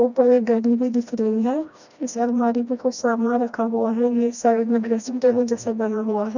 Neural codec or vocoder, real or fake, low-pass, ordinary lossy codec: codec, 16 kHz, 1 kbps, FreqCodec, smaller model; fake; 7.2 kHz; none